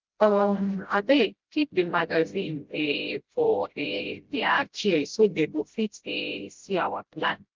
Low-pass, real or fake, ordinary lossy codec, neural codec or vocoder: 7.2 kHz; fake; Opus, 32 kbps; codec, 16 kHz, 0.5 kbps, FreqCodec, smaller model